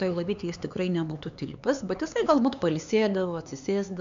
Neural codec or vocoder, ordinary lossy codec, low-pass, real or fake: codec, 16 kHz, 4 kbps, X-Codec, HuBERT features, trained on LibriSpeech; MP3, 64 kbps; 7.2 kHz; fake